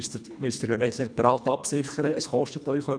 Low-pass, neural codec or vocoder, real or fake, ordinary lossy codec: 9.9 kHz; codec, 24 kHz, 1.5 kbps, HILCodec; fake; none